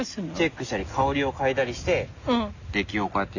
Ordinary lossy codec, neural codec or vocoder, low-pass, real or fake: none; none; 7.2 kHz; real